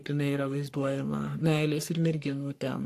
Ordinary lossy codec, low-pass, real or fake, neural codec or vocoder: AAC, 64 kbps; 14.4 kHz; fake; codec, 44.1 kHz, 3.4 kbps, Pupu-Codec